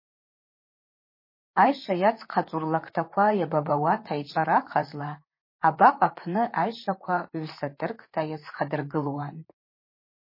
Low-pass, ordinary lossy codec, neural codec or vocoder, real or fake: 5.4 kHz; MP3, 24 kbps; vocoder, 44.1 kHz, 128 mel bands, Pupu-Vocoder; fake